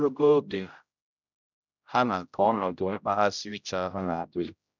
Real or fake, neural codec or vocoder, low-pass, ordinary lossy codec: fake; codec, 16 kHz, 0.5 kbps, X-Codec, HuBERT features, trained on general audio; 7.2 kHz; none